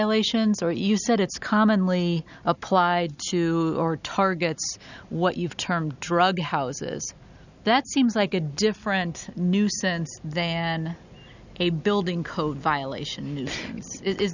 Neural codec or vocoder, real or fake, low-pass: none; real; 7.2 kHz